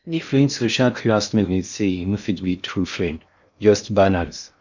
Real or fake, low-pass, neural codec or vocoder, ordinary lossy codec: fake; 7.2 kHz; codec, 16 kHz in and 24 kHz out, 0.6 kbps, FocalCodec, streaming, 2048 codes; none